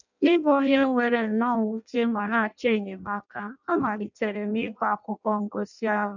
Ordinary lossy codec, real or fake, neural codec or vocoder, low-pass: none; fake; codec, 16 kHz in and 24 kHz out, 0.6 kbps, FireRedTTS-2 codec; 7.2 kHz